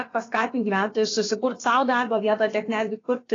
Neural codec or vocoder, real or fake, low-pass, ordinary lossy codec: codec, 16 kHz, about 1 kbps, DyCAST, with the encoder's durations; fake; 7.2 kHz; AAC, 32 kbps